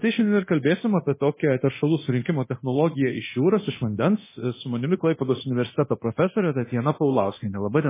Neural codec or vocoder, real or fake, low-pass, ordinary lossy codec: codec, 24 kHz, 0.9 kbps, DualCodec; fake; 3.6 kHz; MP3, 16 kbps